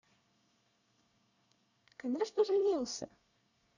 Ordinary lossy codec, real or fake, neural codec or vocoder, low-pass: none; fake; codec, 24 kHz, 1 kbps, SNAC; 7.2 kHz